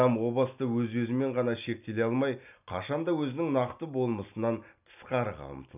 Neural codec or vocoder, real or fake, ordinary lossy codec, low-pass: none; real; none; 3.6 kHz